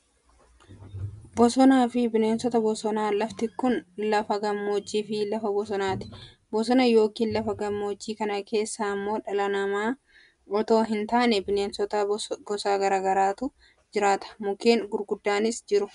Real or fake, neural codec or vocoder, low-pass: real; none; 10.8 kHz